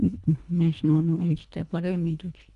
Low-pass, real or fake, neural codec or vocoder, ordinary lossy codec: 10.8 kHz; fake; codec, 24 kHz, 1.5 kbps, HILCodec; none